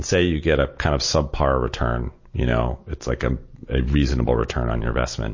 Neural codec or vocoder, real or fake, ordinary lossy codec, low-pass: none; real; MP3, 32 kbps; 7.2 kHz